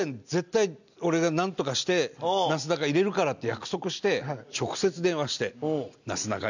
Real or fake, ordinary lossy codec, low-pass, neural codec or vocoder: real; none; 7.2 kHz; none